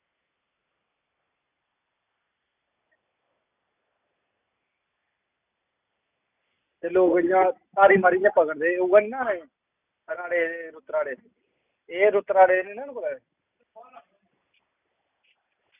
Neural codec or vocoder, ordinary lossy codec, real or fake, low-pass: none; none; real; 3.6 kHz